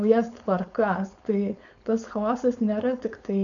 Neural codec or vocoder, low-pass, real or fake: codec, 16 kHz, 4.8 kbps, FACodec; 7.2 kHz; fake